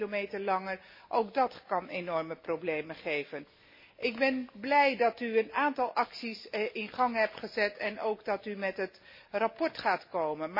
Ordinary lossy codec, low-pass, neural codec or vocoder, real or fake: MP3, 24 kbps; 5.4 kHz; none; real